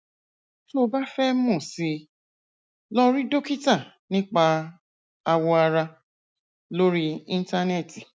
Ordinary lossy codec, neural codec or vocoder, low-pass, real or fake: none; none; none; real